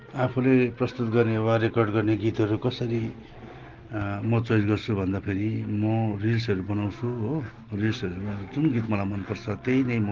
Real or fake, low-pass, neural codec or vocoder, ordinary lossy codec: real; 7.2 kHz; none; Opus, 16 kbps